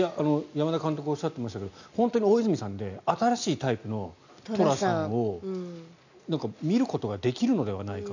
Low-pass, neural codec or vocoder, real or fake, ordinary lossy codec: 7.2 kHz; none; real; none